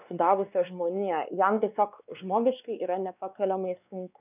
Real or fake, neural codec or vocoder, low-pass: fake; codec, 16 kHz, 2 kbps, X-Codec, WavLM features, trained on Multilingual LibriSpeech; 3.6 kHz